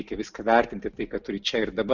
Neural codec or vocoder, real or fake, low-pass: none; real; 7.2 kHz